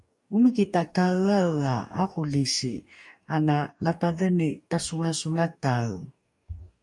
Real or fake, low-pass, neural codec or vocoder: fake; 10.8 kHz; codec, 44.1 kHz, 2.6 kbps, DAC